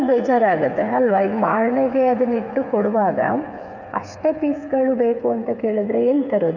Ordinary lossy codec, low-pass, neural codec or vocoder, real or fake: none; 7.2 kHz; codec, 16 kHz, 8 kbps, FreqCodec, smaller model; fake